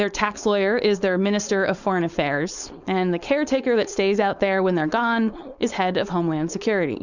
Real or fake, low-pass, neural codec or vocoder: fake; 7.2 kHz; codec, 16 kHz, 4.8 kbps, FACodec